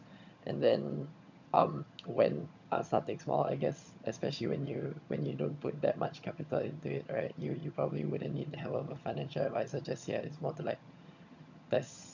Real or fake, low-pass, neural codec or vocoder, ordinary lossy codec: fake; 7.2 kHz; vocoder, 22.05 kHz, 80 mel bands, HiFi-GAN; none